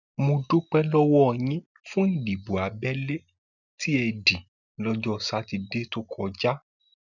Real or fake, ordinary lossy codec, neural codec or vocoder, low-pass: real; none; none; 7.2 kHz